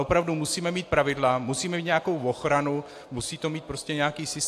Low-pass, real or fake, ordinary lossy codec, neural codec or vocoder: 14.4 kHz; real; AAC, 64 kbps; none